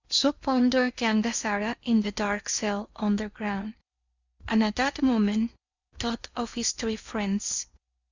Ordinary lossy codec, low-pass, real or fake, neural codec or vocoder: Opus, 64 kbps; 7.2 kHz; fake; codec, 16 kHz in and 24 kHz out, 0.8 kbps, FocalCodec, streaming, 65536 codes